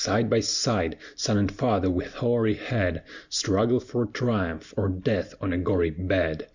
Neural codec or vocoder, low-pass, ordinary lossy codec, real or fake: none; 7.2 kHz; Opus, 64 kbps; real